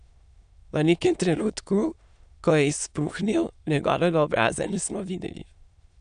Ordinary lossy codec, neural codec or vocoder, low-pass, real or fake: none; autoencoder, 22.05 kHz, a latent of 192 numbers a frame, VITS, trained on many speakers; 9.9 kHz; fake